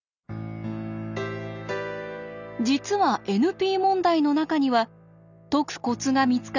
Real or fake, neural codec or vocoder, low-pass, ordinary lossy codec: real; none; 7.2 kHz; none